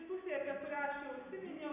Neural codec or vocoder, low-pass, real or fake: none; 3.6 kHz; real